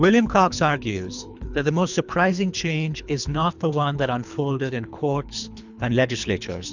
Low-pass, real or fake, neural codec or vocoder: 7.2 kHz; fake; codec, 24 kHz, 3 kbps, HILCodec